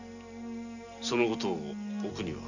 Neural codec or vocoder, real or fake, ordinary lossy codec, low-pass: none; real; none; 7.2 kHz